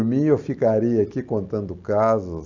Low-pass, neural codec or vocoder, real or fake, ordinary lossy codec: 7.2 kHz; none; real; none